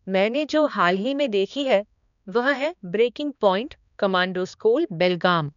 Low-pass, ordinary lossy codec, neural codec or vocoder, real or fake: 7.2 kHz; none; codec, 16 kHz, 2 kbps, X-Codec, HuBERT features, trained on balanced general audio; fake